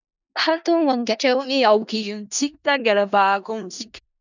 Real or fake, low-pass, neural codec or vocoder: fake; 7.2 kHz; codec, 16 kHz in and 24 kHz out, 0.4 kbps, LongCat-Audio-Codec, four codebook decoder